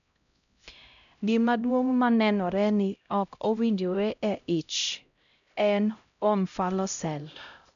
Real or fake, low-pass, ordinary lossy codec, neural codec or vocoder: fake; 7.2 kHz; none; codec, 16 kHz, 0.5 kbps, X-Codec, HuBERT features, trained on LibriSpeech